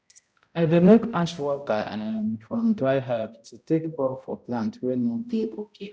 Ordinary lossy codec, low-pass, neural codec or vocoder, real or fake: none; none; codec, 16 kHz, 0.5 kbps, X-Codec, HuBERT features, trained on balanced general audio; fake